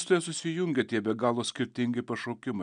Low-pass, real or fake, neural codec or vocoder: 9.9 kHz; real; none